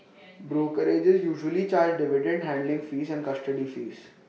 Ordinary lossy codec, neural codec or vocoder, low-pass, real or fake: none; none; none; real